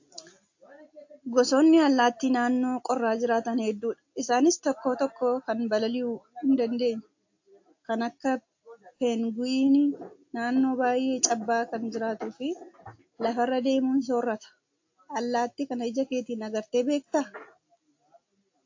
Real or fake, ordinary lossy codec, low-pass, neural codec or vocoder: real; AAC, 48 kbps; 7.2 kHz; none